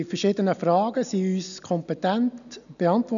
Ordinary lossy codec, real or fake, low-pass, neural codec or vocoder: none; real; 7.2 kHz; none